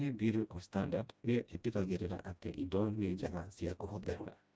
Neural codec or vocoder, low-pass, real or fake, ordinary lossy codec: codec, 16 kHz, 1 kbps, FreqCodec, smaller model; none; fake; none